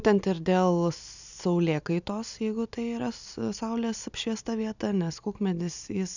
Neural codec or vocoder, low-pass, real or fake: none; 7.2 kHz; real